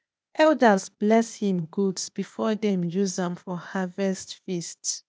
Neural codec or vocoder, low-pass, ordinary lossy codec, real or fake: codec, 16 kHz, 0.8 kbps, ZipCodec; none; none; fake